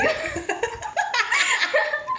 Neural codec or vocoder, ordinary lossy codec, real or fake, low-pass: none; none; real; none